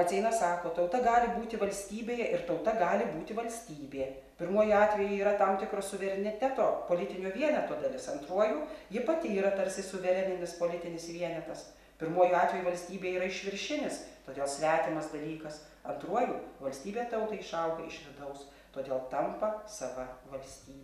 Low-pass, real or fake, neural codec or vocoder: 14.4 kHz; real; none